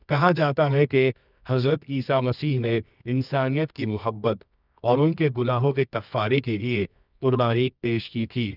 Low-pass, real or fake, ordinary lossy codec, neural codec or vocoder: 5.4 kHz; fake; none; codec, 24 kHz, 0.9 kbps, WavTokenizer, medium music audio release